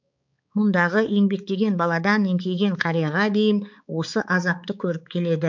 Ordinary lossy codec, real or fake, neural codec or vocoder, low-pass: MP3, 64 kbps; fake; codec, 16 kHz, 4 kbps, X-Codec, HuBERT features, trained on balanced general audio; 7.2 kHz